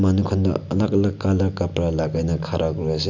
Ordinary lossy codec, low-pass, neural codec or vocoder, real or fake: none; 7.2 kHz; none; real